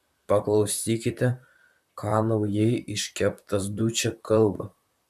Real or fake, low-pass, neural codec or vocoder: fake; 14.4 kHz; vocoder, 44.1 kHz, 128 mel bands, Pupu-Vocoder